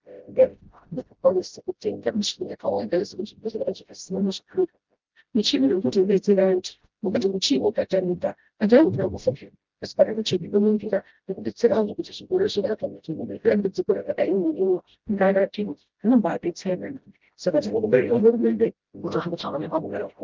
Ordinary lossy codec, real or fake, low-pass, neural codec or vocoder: Opus, 32 kbps; fake; 7.2 kHz; codec, 16 kHz, 0.5 kbps, FreqCodec, smaller model